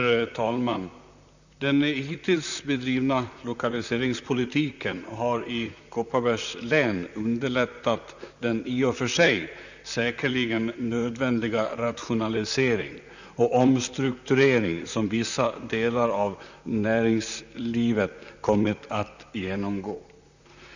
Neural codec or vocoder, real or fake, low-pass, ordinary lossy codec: vocoder, 44.1 kHz, 128 mel bands, Pupu-Vocoder; fake; 7.2 kHz; none